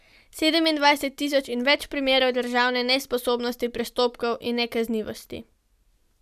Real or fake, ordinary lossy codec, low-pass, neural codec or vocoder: real; none; 14.4 kHz; none